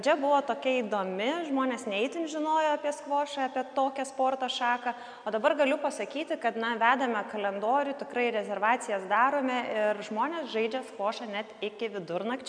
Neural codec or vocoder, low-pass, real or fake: none; 9.9 kHz; real